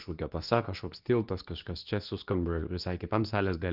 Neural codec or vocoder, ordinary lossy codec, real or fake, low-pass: codec, 24 kHz, 0.9 kbps, WavTokenizer, medium speech release version 2; Opus, 24 kbps; fake; 5.4 kHz